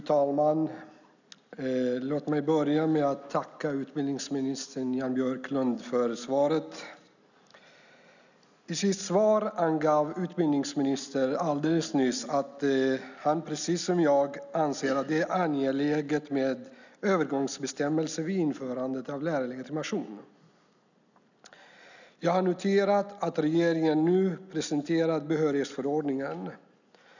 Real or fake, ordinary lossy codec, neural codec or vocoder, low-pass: real; none; none; 7.2 kHz